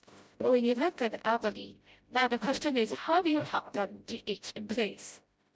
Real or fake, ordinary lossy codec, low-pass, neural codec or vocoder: fake; none; none; codec, 16 kHz, 0.5 kbps, FreqCodec, smaller model